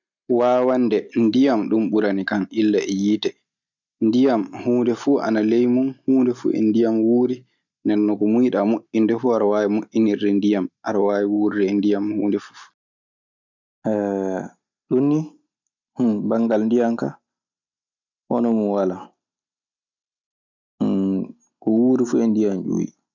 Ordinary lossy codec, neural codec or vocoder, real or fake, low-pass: none; none; real; 7.2 kHz